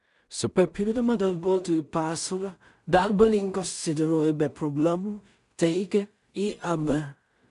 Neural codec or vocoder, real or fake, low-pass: codec, 16 kHz in and 24 kHz out, 0.4 kbps, LongCat-Audio-Codec, two codebook decoder; fake; 10.8 kHz